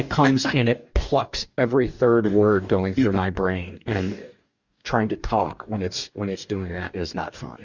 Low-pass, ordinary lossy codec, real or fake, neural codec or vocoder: 7.2 kHz; Opus, 64 kbps; fake; codec, 44.1 kHz, 2.6 kbps, DAC